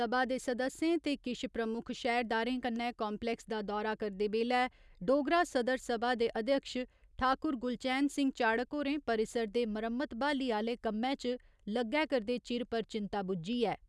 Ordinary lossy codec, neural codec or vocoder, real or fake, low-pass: none; none; real; none